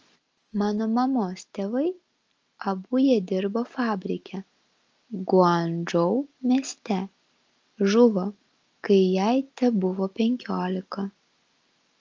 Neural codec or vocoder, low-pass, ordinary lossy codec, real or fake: none; 7.2 kHz; Opus, 24 kbps; real